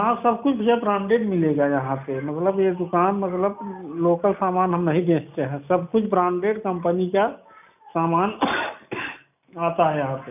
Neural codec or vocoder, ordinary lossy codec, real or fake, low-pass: none; none; real; 3.6 kHz